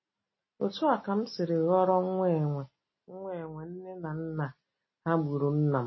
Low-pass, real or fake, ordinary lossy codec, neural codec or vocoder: 7.2 kHz; real; MP3, 24 kbps; none